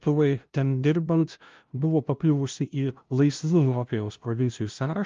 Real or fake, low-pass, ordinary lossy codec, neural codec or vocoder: fake; 7.2 kHz; Opus, 32 kbps; codec, 16 kHz, 0.5 kbps, FunCodec, trained on LibriTTS, 25 frames a second